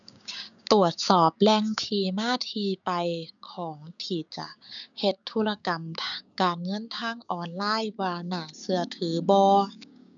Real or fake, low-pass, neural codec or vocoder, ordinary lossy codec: fake; 7.2 kHz; codec, 16 kHz, 6 kbps, DAC; none